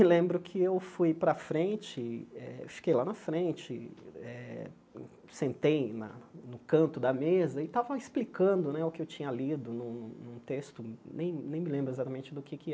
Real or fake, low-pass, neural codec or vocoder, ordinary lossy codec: real; none; none; none